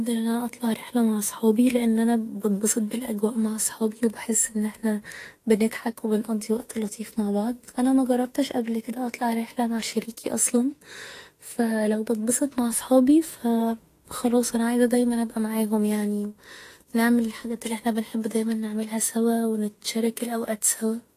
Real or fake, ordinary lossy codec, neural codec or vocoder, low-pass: fake; AAC, 48 kbps; autoencoder, 48 kHz, 32 numbers a frame, DAC-VAE, trained on Japanese speech; 14.4 kHz